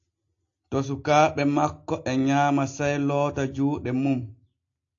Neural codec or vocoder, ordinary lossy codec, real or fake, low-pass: none; AAC, 48 kbps; real; 7.2 kHz